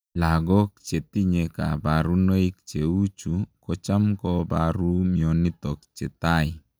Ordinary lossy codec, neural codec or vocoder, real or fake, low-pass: none; none; real; none